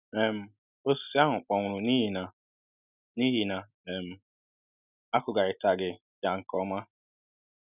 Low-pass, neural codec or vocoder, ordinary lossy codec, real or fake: 3.6 kHz; none; none; real